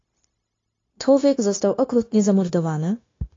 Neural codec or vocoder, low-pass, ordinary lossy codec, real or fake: codec, 16 kHz, 0.9 kbps, LongCat-Audio-Codec; 7.2 kHz; AAC, 32 kbps; fake